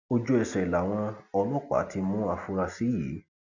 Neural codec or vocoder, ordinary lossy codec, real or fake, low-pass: none; none; real; 7.2 kHz